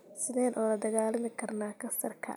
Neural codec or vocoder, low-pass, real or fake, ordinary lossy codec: none; none; real; none